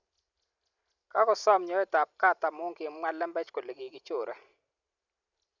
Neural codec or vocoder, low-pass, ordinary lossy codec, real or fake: none; 7.2 kHz; none; real